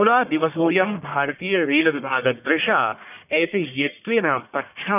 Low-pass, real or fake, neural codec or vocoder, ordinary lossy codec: 3.6 kHz; fake; codec, 44.1 kHz, 1.7 kbps, Pupu-Codec; none